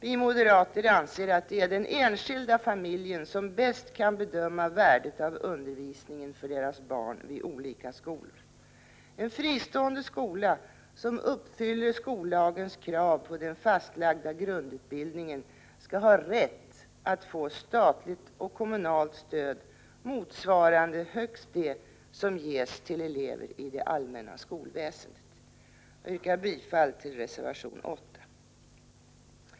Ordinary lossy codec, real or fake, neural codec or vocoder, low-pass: none; real; none; none